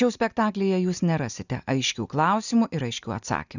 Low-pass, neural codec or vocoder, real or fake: 7.2 kHz; none; real